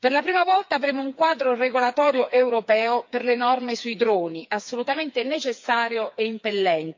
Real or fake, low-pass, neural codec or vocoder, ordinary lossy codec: fake; 7.2 kHz; codec, 16 kHz, 4 kbps, FreqCodec, smaller model; MP3, 48 kbps